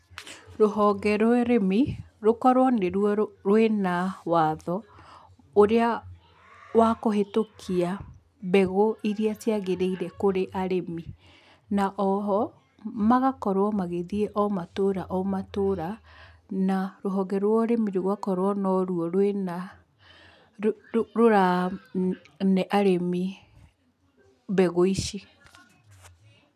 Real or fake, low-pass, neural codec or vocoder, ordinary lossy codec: real; 14.4 kHz; none; none